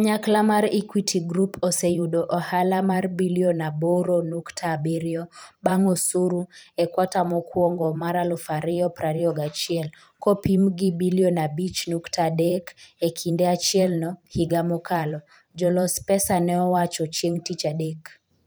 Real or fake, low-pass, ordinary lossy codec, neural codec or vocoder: fake; none; none; vocoder, 44.1 kHz, 128 mel bands every 512 samples, BigVGAN v2